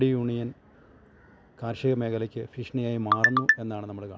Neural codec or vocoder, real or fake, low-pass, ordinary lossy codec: none; real; none; none